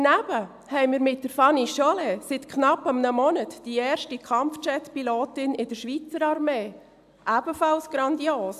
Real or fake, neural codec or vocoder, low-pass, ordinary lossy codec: real; none; 14.4 kHz; AAC, 96 kbps